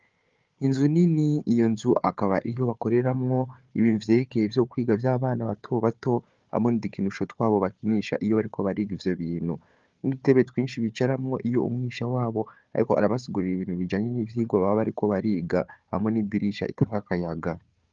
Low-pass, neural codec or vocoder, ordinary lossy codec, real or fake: 7.2 kHz; codec, 16 kHz, 4 kbps, FunCodec, trained on Chinese and English, 50 frames a second; Opus, 32 kbps; fake